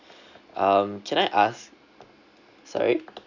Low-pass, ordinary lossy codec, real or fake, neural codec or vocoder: 7.2 kHz; none; real; none